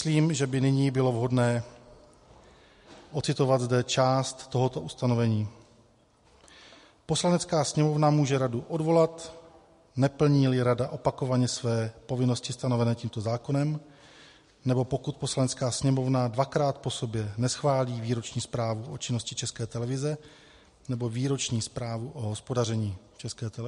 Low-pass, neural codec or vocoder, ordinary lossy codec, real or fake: 14.4 kHz; none; MP3, 48 kbps; real